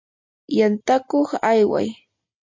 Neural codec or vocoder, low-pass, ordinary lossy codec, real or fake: none; 7.2 kHz; MP3, 64 kbps; real